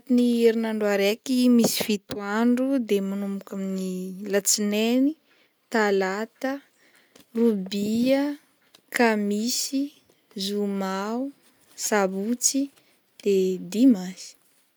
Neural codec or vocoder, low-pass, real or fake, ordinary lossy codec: none; none; real; none